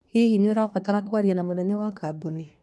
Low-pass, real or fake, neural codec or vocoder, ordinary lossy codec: none; fake; codec, 24 kHz, 1 kbps, SNAC; none